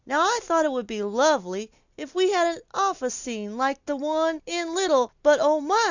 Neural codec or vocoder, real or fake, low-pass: none; real; 7.2 kHz